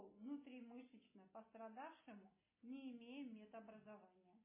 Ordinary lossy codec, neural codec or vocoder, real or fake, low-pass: MP3, 16 kbps; none; real; 3.6 kHz